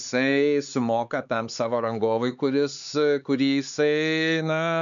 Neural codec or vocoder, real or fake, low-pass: codec, 16 kHz, 4 kbps, X-Codec, HuBERT features, trained on LibriSpeech; fake; 7.2 kHz